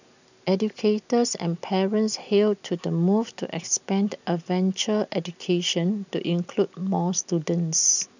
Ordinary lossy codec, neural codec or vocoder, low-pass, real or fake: none; none; 7.2 kHz; real